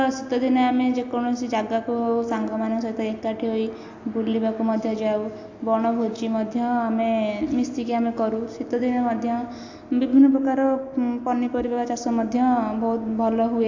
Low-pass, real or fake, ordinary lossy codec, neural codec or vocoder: 7.2 kHz; real; AAC, 48 kbps; none